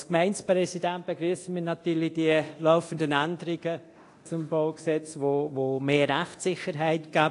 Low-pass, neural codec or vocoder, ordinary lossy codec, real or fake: 10.8 kHz; codec, 24 kHz, 0.9 kbps, DualCodec; AAC, 48 kbps; fake